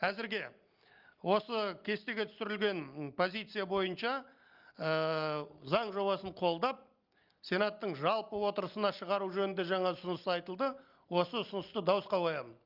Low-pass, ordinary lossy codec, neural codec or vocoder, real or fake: 5.4 kHz; Opus, 24 kbps; none; real